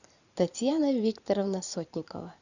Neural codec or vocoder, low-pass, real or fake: vocoder, 44.1 kHz, 128 mel bands every 512 samples, BigVGAN v2; 7.2 kHz; fake